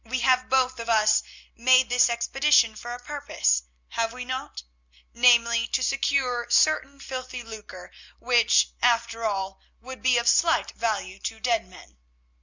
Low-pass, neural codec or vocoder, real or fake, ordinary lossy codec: 7.2 kHz; none; real; Opus, 64 kbps